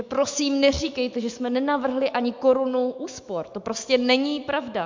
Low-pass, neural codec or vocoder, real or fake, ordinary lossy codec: 7.2 kHz; autoencoder, 48 kHz, 128 numbers a frame, DAC-VAE, trained on Japanese speech; fake; MP3, 64 kbps